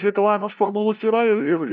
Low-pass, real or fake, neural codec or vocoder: 7.2 kHz; fake; codec, 16 kHz, 0.5 kbps, FunCodec, trained on LibriTTS, 25 frames a second